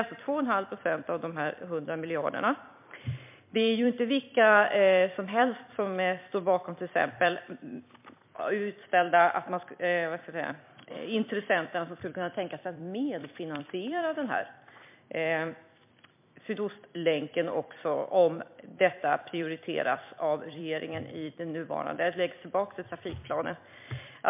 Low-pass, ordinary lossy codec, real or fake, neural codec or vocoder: 3.6 kHz; MP3, 32 kbps; fake; vocoder, 44.1 kHz, 128 mel bands every 256 samples, BigVGAN v2